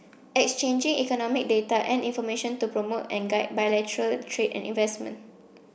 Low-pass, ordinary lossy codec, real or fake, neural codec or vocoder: none; none; real; none